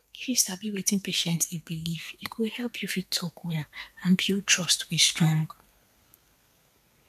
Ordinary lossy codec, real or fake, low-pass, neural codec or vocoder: none; fake; 14.4 kHz; codec, 32 kHz, 1.9 kbps, SNAC